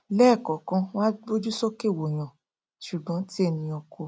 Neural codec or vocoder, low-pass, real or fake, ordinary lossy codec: none; none; real; none